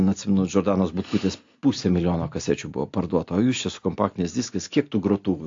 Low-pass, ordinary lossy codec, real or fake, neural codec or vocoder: 7.2 kHz; AAC, 48 kbps; real; none